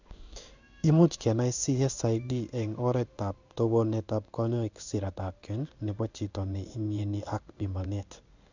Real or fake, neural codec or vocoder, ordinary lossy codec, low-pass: fake; codec, 16 kHz in and 24 kHz out, 1 kbps, XY-Tokenizer; none; 7.2 kHz